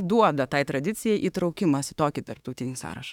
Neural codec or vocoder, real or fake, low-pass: autoencoder, 48 kHz, 32 numbers a frame, DAC-VAE, trained on Japanese speech; fake; 19.8 kHz